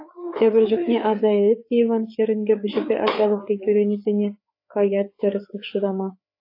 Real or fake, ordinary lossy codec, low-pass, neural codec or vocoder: fake; AAC, 32 kbps; 5.4 kHz; codec, 16 kHz, 4 kbps, FreqCodec, larger model